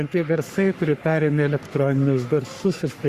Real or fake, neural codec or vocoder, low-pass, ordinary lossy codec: fake; codec, 44.1 kHz, 3.4 kbps, Pupu-Codec; 14.4 kHz; Opus, 64 kbps